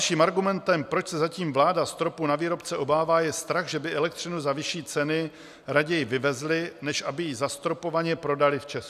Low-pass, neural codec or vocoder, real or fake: 14.4 kHz; none; real